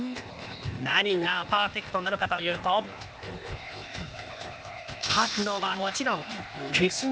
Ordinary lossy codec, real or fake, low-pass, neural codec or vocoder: none; fake; none; codec, 16 kHz, 0.8 kbps, ZipCodec